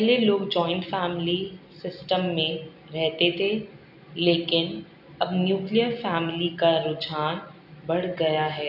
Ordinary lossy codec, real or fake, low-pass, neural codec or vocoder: none; real; 5.4 kHz; none